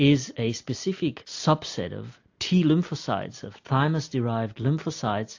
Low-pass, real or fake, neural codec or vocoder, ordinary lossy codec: 7.2 kHz; real; none; AAC, 48 kbps